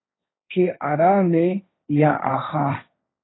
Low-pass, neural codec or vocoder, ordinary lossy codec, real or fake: 7.2 kHz; codec, 16 kHz, 1.1 kbps, Voila-Tokenizer; AAC, 16 kbps; fake